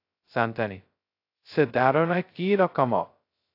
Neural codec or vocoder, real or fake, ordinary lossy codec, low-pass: codec, 16 kHz, 0.2 kbps, FocalCodec; fake; AAC, 32 kbps; 5.4 kHz